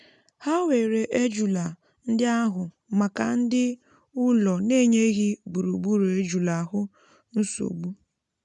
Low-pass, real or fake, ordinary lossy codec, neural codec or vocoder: 10.8 kHz; real; none; none